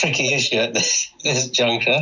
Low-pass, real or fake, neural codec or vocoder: 7.2 kHz; real; none